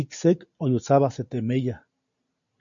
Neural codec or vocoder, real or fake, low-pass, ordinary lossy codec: none; real; 7.2 kHz; AAC, 64 kbps